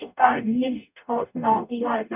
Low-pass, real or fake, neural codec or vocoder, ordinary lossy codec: 3.6 kHz; fake; codec, 44.1 kHz, 0.9 kbps, DAC; none